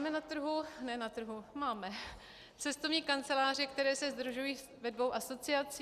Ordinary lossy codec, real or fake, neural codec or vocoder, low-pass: Opus, 64 kbps; real; none; 14.4 kHz